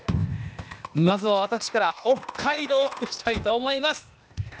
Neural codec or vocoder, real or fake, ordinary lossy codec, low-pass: codec, 16 kHz, 0.8 kbps, ZipCodec; fake; none; none